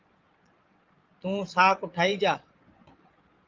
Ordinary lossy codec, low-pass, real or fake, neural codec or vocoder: Opus, 24 kbps; 7.2 kHz; fake; vocoder, 22.05 kHz, 80 mel bands, Vocos